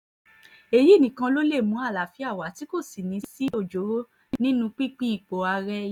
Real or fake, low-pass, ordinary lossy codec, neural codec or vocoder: real; 19.8 kHz; none; none